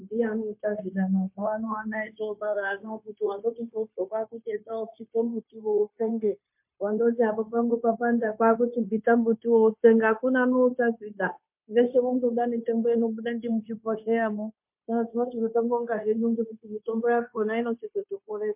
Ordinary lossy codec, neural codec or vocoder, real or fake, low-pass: MP3, 32 kbps; codec, 16 kHz, 0.9 kbps, LongCat-Audio-Codec; fake; 3.6 kHz